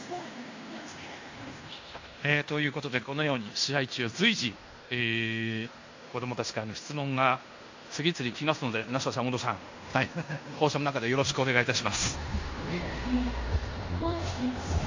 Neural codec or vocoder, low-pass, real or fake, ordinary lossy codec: codec, 16 kHz in and 24 kHz out, 0.9 kbps, LongCat-Audio-Codec, fine tuned four codebook decoder; 7.2 kHz; fake; AAC, 48 kbps